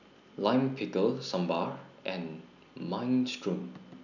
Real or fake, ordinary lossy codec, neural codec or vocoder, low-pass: real; none; none; 7.2 kHz